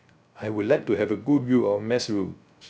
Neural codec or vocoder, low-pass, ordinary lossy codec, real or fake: codec, 16 kHz, 0.3 kbps, FocalCodec; none; none; fake